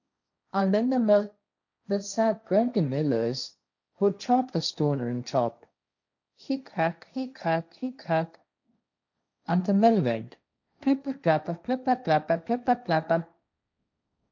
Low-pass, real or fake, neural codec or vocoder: 7.2 kHz; fake; codec, 16 kHz, 1.1 kbps, Voila-Tokenizer